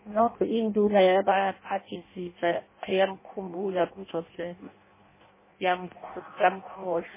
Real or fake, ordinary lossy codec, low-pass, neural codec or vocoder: fake; MP3, 16 kbps; 3.6 kHz; codec, 16 kHz in and 24 kHz out, 0.6 kbps, FireRedTTS-2 codec